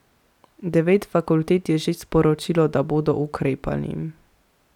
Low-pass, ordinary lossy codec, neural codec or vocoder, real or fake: 19.8 kHz; none; none; real